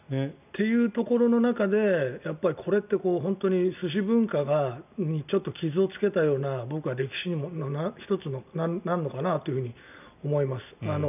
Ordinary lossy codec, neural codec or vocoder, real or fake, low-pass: none; none; real; 3.6 kHz